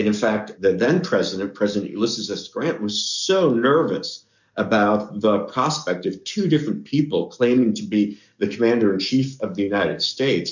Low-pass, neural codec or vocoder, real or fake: 7.2 kHz; codec, 44.1 kHz, 7.8 kbps, DAC; fake